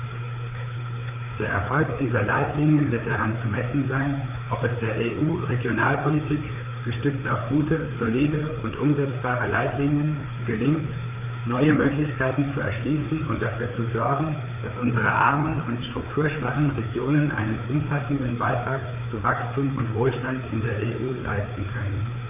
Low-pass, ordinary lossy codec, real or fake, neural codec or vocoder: 3.6 kHz; none; fake; codec, 16 kHz, 4 kbps, FreqCodec, larger model